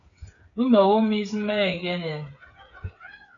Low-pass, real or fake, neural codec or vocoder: 7.2 kHz; fake; codec, 16 kHz, 8 kbps, FreqCodec, smaller model